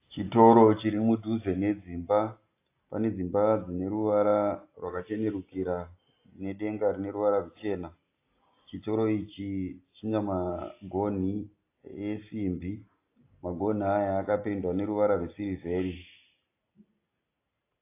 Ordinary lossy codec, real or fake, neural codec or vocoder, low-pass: AAC, 24 kbps; real; none; 3.6 kHz